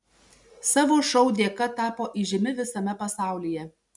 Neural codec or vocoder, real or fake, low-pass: none; real; 10.8 kHz